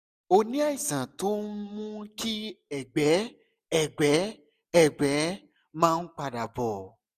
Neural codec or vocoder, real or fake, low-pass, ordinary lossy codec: none; real; 14.4 kHz; none